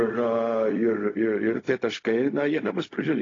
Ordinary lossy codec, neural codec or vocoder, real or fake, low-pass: AAC, 32 kbps; codec, 16 kHz, 0.4 kbps, LongCat-Audio-Codec; fake; 7.2 kHz